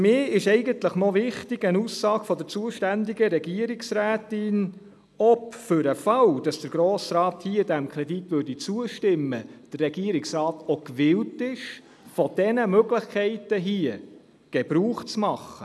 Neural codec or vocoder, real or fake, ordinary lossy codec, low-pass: none; real; none; none